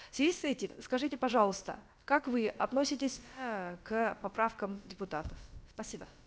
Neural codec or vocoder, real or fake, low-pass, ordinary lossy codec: codec, 16 kHz, about 1 kbps, DyCAST, with the encoder's durations; fake; none; none